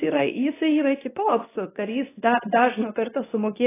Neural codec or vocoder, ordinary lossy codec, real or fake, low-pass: codec, 24 kHz, 0.9 kbps, WavTokenizer, medium speech release version 2; AAC, 16 kbps; fake; 3.6 kHz